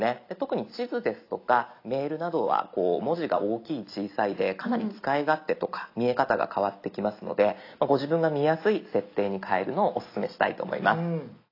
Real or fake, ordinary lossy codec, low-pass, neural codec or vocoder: real; AAC, 32 kbps; 5.4 kHz; none